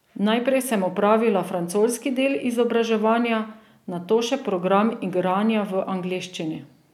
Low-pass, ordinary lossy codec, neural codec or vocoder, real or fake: 19.8 kHz; none; none; real